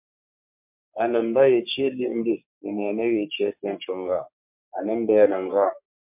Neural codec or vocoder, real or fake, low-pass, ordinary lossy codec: codec, 44.1 kHz, 3.4 kbps, Pupu-Codec; fake; 3.6 kHz; MP3, 32 kbps